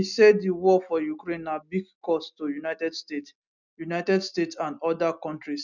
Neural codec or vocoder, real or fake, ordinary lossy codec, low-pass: none; real; none; 7.2 kHz